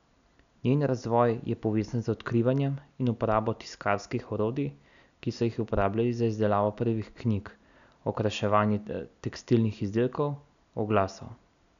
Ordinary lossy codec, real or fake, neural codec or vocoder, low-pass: none; real; none; 7.2 kHz